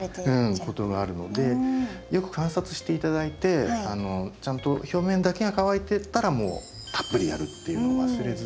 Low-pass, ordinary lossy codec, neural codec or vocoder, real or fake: none; none; none; real